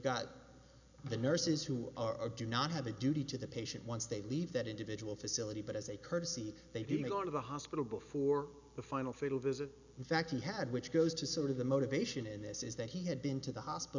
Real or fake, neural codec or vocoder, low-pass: real; none; 7.2 kHz